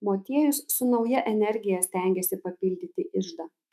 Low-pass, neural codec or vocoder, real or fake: 14.4 kHz; autoencoder, 48 kHz, 128 numbers a frame, DAC-VAE, trained on Japanese speech; fake